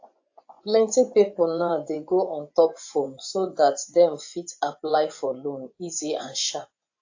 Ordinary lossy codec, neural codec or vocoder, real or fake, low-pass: AAC, 48 kbps; vocoder, 24 kHz, 100 mel bands, Vocos; fake; 7.2 kHz